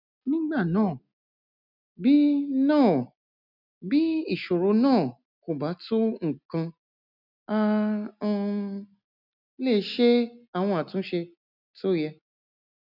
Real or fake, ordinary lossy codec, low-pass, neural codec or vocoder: real; none; 5.4 kHz; none